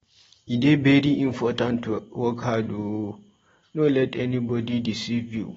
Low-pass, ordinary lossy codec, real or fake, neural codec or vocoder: 19.8 kHz; AAC, 24 kbps; real; none